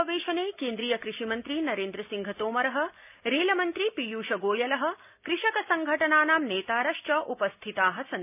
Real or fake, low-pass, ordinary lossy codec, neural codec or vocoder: real; 3.6 kHz; none; none